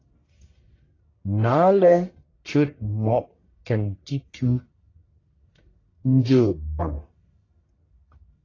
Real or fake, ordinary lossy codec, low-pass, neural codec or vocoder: fake; AAC, 32 kbps; 7.2 kHz; codec, 44.1 kHz, 1.7 kbps, Pupu-Codec